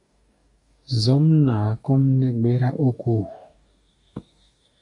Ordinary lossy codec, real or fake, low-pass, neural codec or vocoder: AAC, 48 kbps; fake; 10.8 kHz; codec, 44.1 kHz, 2.6 kbps, DAC